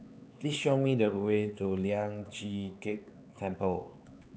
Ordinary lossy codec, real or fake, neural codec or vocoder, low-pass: none; fake; codec, 16 kHz, 4 kbps, X-Codec, HuBERT features, trained on LibriSpeech; none